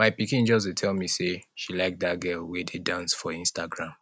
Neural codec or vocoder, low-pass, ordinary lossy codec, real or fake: none; none; none; real